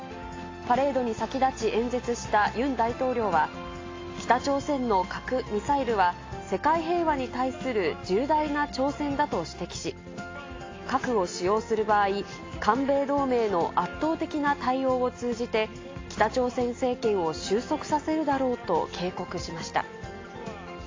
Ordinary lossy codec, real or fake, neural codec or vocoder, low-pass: AAC, 32 kbps; real; none; 7.2 kHz